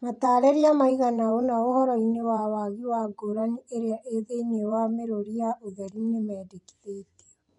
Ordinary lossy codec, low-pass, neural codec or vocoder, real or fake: none; 10.8 kHz; vocoder, 24 kHz, 100 mel bands, Vocos; fake